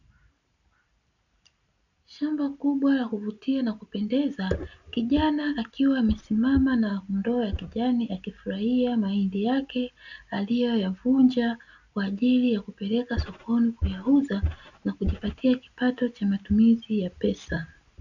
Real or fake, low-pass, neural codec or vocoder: real; 7.2 kHz; none